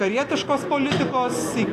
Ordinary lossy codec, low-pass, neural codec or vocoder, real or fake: AAC, 64 kbps; 14.4 kHz; none; real